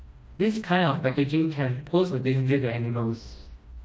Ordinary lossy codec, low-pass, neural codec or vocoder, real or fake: none; none; codec, 16 kHz, 1 kbps, FreqCodec, smaller model; fake